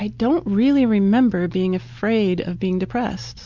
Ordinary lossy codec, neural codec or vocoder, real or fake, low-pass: MP3, 64 kbps; none; real; 7.2 kHz